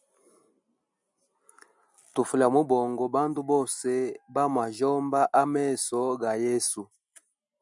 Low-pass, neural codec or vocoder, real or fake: 10.8 kHz; none; real